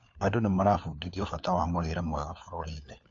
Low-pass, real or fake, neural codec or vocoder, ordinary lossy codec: 7.2 kHz; fake; codec, 16 kHz, 4 kbps, FunCodec, trained on LibriTTS, 50 frames a second; AAC, 48 kbps